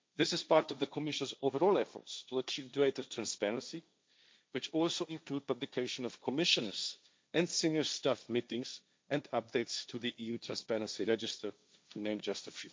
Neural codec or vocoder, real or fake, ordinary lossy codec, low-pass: codec, 16 kHz, 1.1 kbps, Voila-Tokenizer; fake; none; none